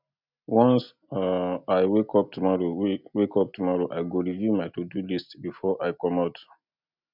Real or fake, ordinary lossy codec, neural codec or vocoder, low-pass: real; none; none; 5.4 kHz